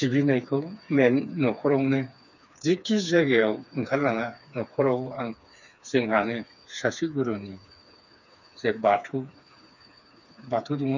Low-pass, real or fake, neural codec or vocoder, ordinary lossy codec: 7.2 kHz; fake; codec, 16 kHz, 4 kbps, FreqCodec, smaller model; none